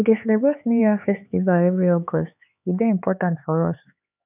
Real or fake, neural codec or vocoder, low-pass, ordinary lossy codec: fake; codec, 16 kHz, 4 kbps, X-Codec, HuBERT features, trained on LibriSpeech; 3.6 kHz; none